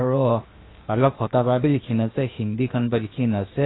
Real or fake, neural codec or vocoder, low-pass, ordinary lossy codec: fake; codec, 16 kHz, 0.8 kbps, ZipCodec; 7.2 kHz; AAC, 16 kbps